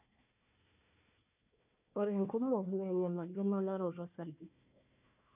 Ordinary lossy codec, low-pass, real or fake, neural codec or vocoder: none; 3.6 kHz; fake; codec, 16 kHz, 1 kbps, FunCodec, trained on Chinese and English, 50 frames a second